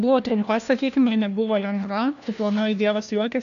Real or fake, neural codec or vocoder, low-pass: fake; codec, 16 kHz, 1 kbps, FunCodec, trained on LibriTTS, 50 frames a second; 7.2 kHz